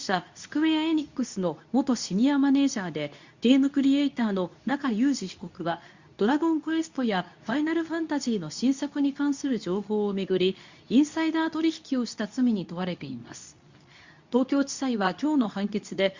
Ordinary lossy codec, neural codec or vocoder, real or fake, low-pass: Opus, 64 kbps; codec, 24 kHz, 0.9 kbps, WavTokenizer, medium speech release version 2; fake; 7.2 kHz